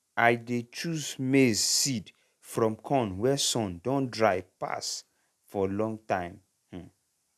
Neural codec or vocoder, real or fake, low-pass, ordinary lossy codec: none; real; 14.4 kHz; none